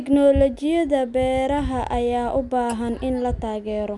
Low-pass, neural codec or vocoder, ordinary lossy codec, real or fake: 10.8 kHz; none; none; real